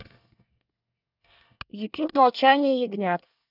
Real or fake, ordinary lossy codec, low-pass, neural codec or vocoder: fake; none; 5.4 kHz; codec, 24 kHz, 1 kbps, SNAC